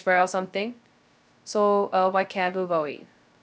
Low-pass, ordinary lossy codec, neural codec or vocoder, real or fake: none; none; codec, 16 kHz, 0.2 kbps, FocalCodec; fake